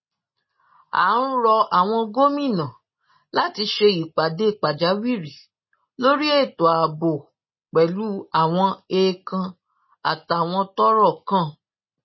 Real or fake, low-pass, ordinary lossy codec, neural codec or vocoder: real; 7.2 kHz; MP3, 24 kbps; none